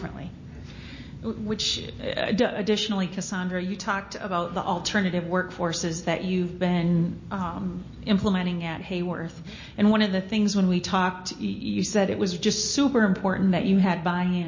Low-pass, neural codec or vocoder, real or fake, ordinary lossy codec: 7.2 kHz; none; real; MP3, 32 kbps